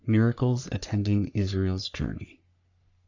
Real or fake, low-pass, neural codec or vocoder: fake; 7.2 kHz; codec, 44.1 kHz, 3.4 kbps, Pupu-Codec